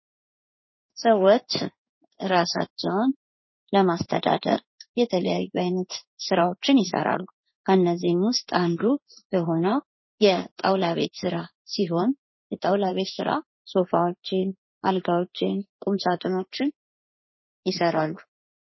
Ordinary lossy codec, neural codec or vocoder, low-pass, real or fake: MP3, 24 kbps; codec, 16 kHz in and 24 kHz out, 1 kbps, XY-Tokenizer; 7.2 kHz; fake